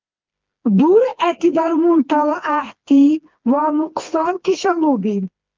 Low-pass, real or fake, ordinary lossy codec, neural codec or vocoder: 7.2 kHz; fake; Opus, 24 kbps; codec, 16 kHz, 2 kbps, FreqCodec, smaller model